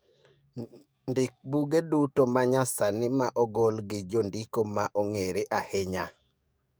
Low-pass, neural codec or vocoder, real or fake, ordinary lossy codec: none; codec, 44.1 kHz, 7.8 kbps, DAC; fake; none